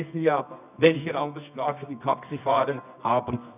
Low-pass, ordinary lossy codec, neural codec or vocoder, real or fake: 3.6 kHz; none; codec, 24 kHz, 0.9 kbps, WavTokenizer, medium music audio release; fake